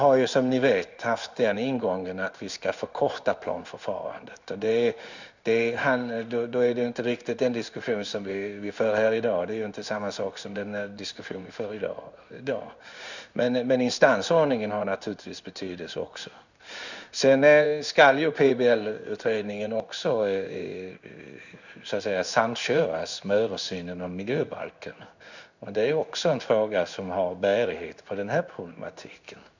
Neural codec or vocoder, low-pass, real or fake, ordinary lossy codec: codec, 16 kHz in and 24 kHz out, 1 kbps, XY-Tokenizer; 7.2 kHz; fake; none